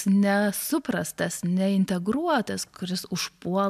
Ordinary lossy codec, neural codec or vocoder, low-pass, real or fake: MP3, 96 kbps; none; 14.4 kHz; real